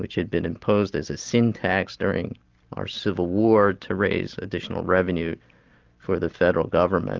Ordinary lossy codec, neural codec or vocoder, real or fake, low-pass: Opus, 24 kbps; none; real; 7.2 kHz